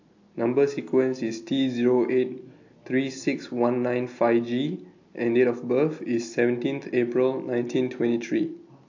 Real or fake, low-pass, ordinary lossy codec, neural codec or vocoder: real; 7.2 kHz; AAC, 48 kbps; none